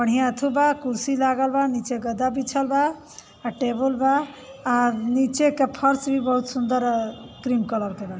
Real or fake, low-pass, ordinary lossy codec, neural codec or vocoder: real; none; none; none